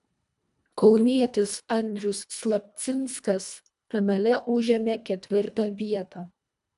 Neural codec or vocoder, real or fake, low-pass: codec, 24 kHz, 1.5 kbps, HILCodec; fake; 10.8 kHz